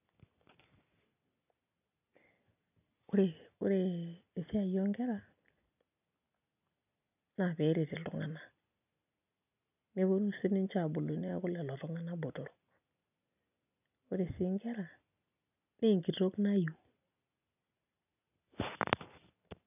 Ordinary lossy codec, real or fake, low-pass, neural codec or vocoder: none; real; 3.6 kHz; none